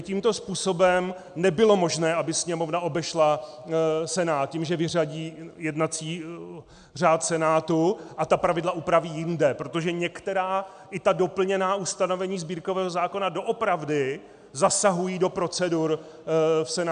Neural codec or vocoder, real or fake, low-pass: none; real; 9.9 kHz